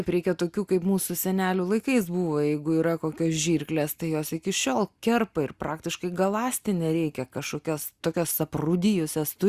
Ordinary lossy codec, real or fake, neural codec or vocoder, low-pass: Opus, 64 kbps; real; none; 14.4 kHz